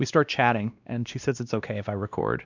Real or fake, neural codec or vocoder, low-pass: fake; codec, 16 kHz, 1 kbps, X-Codec, WavLM features, trained on Multilingual LibriSpeech; 7.2 kHz